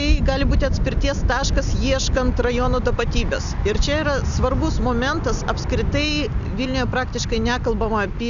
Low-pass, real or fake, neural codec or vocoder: 7.2 kHz; real; none